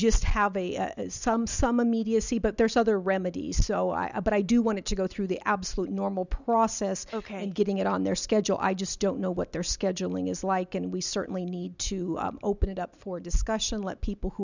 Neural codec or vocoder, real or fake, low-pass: none; real; 7.2 kHz